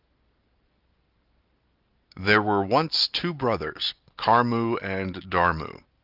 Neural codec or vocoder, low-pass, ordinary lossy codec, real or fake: none; 5.4 kHz; Opus, 24 kbps; real